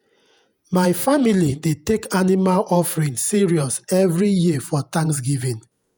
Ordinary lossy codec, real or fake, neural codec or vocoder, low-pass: none; fake; vocoder, 48 kHz, 128 mel bands, Vocos; none